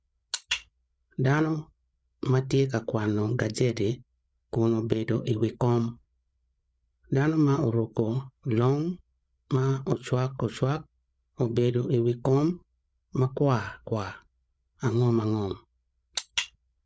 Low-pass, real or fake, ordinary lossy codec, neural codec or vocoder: none; fake; none; codec, 16 kHz, 8 kbps, FreqCodec, larger model